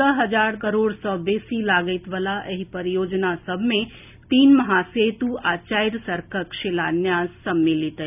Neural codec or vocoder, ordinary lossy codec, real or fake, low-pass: none; none; real; 3.6 kHz